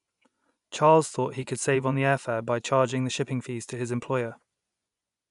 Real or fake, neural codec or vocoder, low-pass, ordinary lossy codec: fake; vocoder, 24 kHz, 100 mel bands, Vocos; 10.8 kHz; none